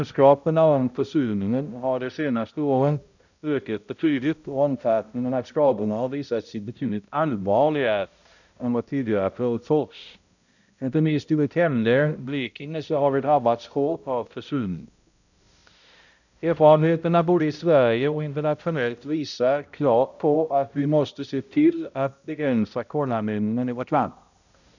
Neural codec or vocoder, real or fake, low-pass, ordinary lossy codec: codec, 16 kHz, 0.5 kbps, X-Codec, HuBERT features, trained on balanced general audio; fake; 7.2 kHz; none